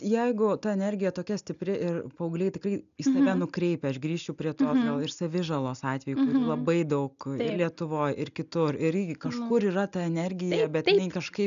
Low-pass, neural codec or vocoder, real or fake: 7.2 kHz; none; real